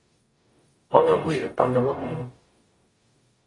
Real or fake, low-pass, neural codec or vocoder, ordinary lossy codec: fake; 10.8 kHz; codec, 44.1 kHz, 0.9 kbps, DAC; AAC, 32 kbps